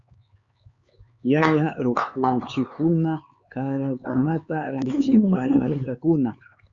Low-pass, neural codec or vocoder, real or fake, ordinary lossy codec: 7.2 kHz; codec, 16 kHz, 4 kbps, X-Codec, HuBERT features, trained on LibriSpeech; fake; Opus, 64 kbps